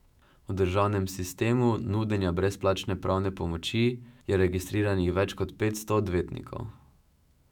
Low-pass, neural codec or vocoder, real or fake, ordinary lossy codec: 19.8 kHz; autoencoder, 48 kHz, 128 numbers a frame, DAC-VAE, trained on Japanese speech; fake; none